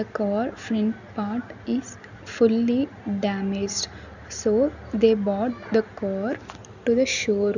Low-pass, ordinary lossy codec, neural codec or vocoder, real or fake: 7.2 kHz; none; none; real